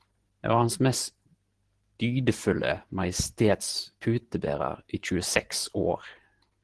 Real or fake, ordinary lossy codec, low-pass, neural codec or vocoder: fake; Opus, 16 kbps; 10.8 kHz; vocoder, 44.1 kHz, 128 mel bands every 512 samples, BigVGAN v2